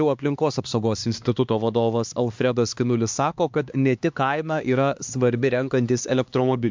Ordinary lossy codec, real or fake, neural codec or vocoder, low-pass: MP3, 64 kbps; fake; codec, 16 kHz, 2 kbps, X-Codec, HuBERT features, trained on LibriSpeech; 7.2 kHz